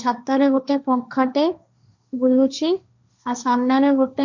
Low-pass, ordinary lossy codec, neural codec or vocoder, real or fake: 7.2 kHz; none; codec, 16 kHz, 1.1 kbps, Voila-Tokenizer; fake